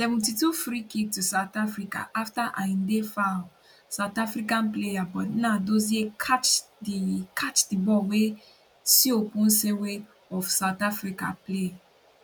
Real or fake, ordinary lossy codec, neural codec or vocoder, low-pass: real; none; none; none